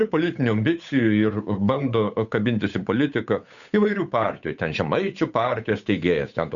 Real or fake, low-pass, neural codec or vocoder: fake; 7.2 kHz; codec, 16 kHz, 8 kbps, FunCodec, trained on Chinese and English, 25 frames a second